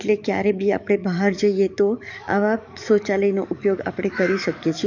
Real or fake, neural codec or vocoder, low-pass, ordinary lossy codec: fake; codec, 44.1 kHz, 7.8 kbps, DAC; 7.2 kHz; none